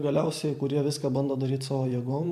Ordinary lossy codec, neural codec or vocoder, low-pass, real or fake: MP3, 96 kbps; vocoder, 44.1 kHz, 128 mel bands every 512 samples, BigVGAN v2; 14.4 kHz; fake